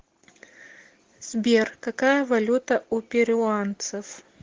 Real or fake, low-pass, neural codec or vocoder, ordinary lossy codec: real; 7.2 kHz; none; Opus, 16 kbps